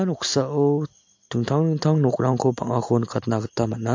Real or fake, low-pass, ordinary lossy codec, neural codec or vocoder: real; 7.2 kHz; MP3, 48 kbps; none